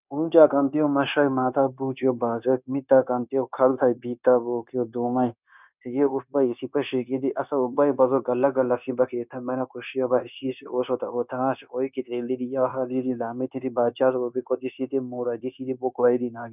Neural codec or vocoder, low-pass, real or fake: codec, 16 kHz, 0.9 kbps, LongCat-Audio-Codec; 3.6 kHz; fake